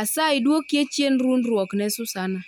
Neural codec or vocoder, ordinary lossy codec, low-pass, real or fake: none; none; 19.8 kHz; real